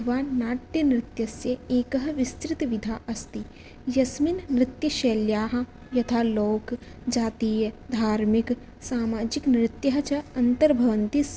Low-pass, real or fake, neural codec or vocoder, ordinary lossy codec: none; real; none; none